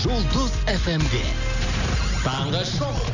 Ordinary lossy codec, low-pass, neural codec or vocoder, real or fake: none; 7.2 kHz; none; real